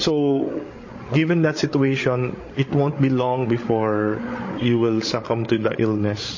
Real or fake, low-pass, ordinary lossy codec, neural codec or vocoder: fake; 7.2 kHz; MP3, 32 kbps; codec, 16 kHz, 8 kbps, FreqCodec, larger model